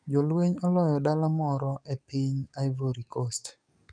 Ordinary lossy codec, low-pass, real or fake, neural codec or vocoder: none; 9.9 kHz; fake; codec, 44.1 kHz, 7.8 kbps, DAC